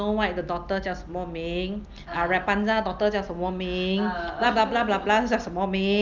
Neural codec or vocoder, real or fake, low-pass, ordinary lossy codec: none; real; 7.2 kHz; Opus, 24 kbps